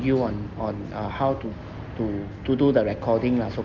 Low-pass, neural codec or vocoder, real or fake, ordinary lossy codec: 7.2 kHz; none; real; Opus, 32 kbps